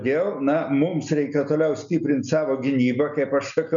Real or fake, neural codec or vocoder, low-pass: real; none; 7.2 kHz